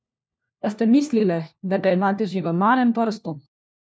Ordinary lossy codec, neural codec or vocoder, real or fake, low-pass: none; codec, 16 kHz, 1 kbps, FunCodec, trained on LibriTTS, 50 frames a second; fake; none